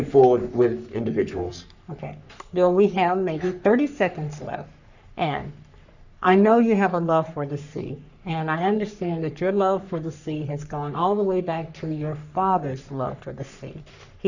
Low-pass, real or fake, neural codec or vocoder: 7.2 kHz; fake; codec, 44.1 kHz, 3.4 kbps, Pupu-Codec